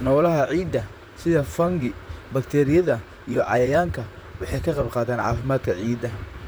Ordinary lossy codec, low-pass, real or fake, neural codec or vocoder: none; none; fake; vocoder, 44.1 kHz, 128 mel bands, Pupu-Vocoder